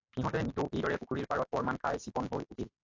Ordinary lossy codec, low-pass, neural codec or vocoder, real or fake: AAC, 48 kbps; 7.2 kHz; none; real